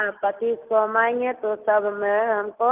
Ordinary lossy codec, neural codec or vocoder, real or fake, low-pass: Opus, 32 kbps; none; real; 3.6 kHz